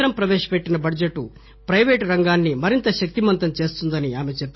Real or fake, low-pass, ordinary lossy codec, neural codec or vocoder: real; 7.2 kHz; MP3, 24 kbps; none